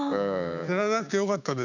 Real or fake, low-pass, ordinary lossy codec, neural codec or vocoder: fake; 7.2 kHz; none; codec, 16 kHz, 6 kbps, DAC